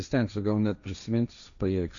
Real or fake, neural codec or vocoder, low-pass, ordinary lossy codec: fake; codec, 16 kHz, 1.1 kbps, Voila-Tokenizer; 7.2 kHz; MP3, 96 kbps